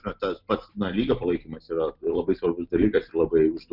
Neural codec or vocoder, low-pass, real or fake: none; 5.4 kHz; real